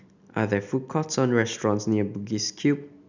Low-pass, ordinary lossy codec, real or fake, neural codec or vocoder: 7.2 kHz; none; real; none